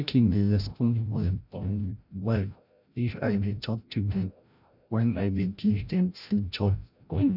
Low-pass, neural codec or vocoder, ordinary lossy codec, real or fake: 5.4 kHz; codec, 16 kHz, 0.5 kbps, FreqCodec, larger model; none; fake